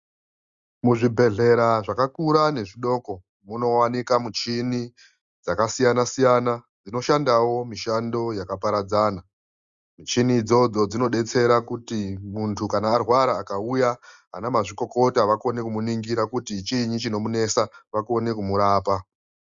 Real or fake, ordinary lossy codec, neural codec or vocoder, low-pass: real; Opus, 64 kbps; none; 7.2 kHz